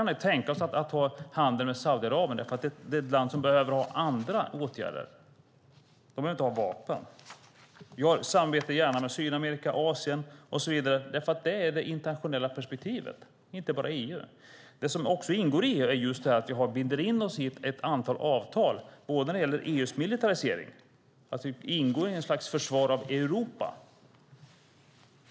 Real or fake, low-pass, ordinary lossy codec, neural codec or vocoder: real; none; none; none